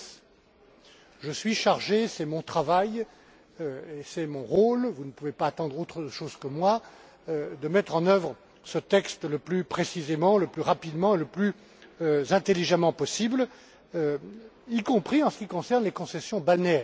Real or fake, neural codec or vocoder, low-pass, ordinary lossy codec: real; none; none; none